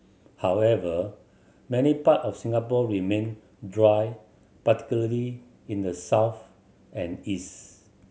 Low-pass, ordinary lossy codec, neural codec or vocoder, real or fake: none; none; none; real